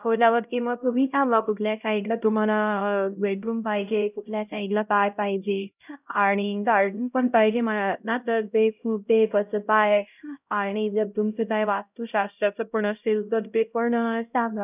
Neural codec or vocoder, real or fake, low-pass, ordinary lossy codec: codec, 16 kHz, 0.5 kbps, X-Codec, HuBERT features, trained on LibriSpeech; fake; 3.6 kHz; none